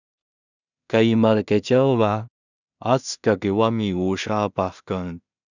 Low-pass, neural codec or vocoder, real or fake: 7.2 kHz; codec, 16 kHz in and 24 kHz out, 0.4 kbps, LongCat-Audio-Codec, two codebook decoder; fake